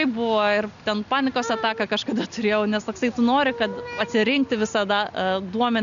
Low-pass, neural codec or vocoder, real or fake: 7.2 kHz; none; real